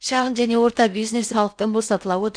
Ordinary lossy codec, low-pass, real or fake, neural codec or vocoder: none; 9.9 kHz; fake; codec, 16 kHz in and 24 kHz out, 0.6 kbps, FocalCodec, streaming, 4096 codes